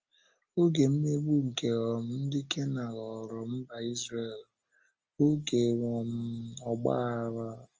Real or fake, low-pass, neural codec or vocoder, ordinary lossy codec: real; 7.2 kHz; none; Opus, 24 kbps